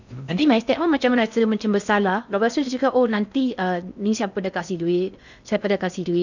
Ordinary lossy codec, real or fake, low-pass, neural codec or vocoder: none; fake; 7.2 kHz; codec, 16 kHz in and 24 kHz out, 0.6 kbps, FocalCodec, streaming, 4096 codes